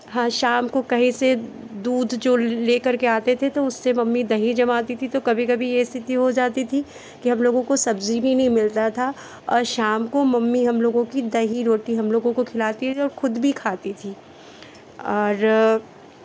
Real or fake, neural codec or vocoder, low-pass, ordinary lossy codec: real; none; none; none